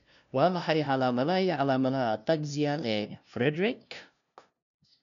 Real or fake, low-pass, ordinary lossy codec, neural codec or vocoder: fake; 7.2 kHz; none; codec, 16 kHz, 0.5 kbps, FunCodec, trained on LibriTTS, 25 frames a second